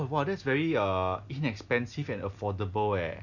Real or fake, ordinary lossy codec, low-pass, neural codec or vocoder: real; none; 7.2 kHz; none